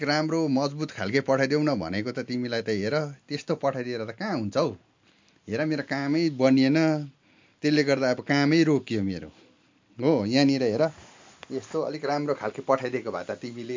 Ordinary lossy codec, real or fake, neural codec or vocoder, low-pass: MP3, 48 kbps; real; none; 7.2 kHz